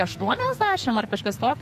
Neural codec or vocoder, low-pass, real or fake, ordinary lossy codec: codec, 44.1 kHz, 2.6 kbps, SNAC; 14.4 kHz; fake; MP3, 64 kbps